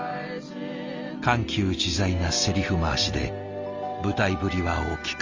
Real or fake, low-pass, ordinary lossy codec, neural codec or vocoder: real; 7.2 kHz; Opus, 32 kbps; none